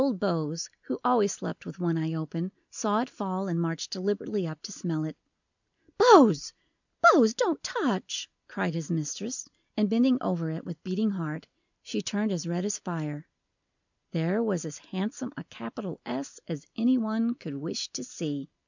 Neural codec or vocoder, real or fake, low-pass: none; real; 7.2 kHz